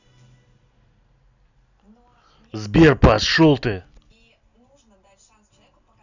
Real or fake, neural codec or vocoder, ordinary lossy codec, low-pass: real; none; none; 7.2 kHz